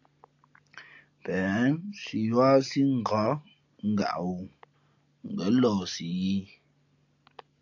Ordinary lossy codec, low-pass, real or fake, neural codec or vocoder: AAC, 48 kbps; 7.2 kHz; real; none